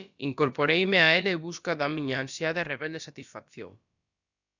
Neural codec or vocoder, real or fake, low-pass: codec, 16 kHz, about 1 kbps, DyCAST, with the encoder's durations; fake; 7.2 kHz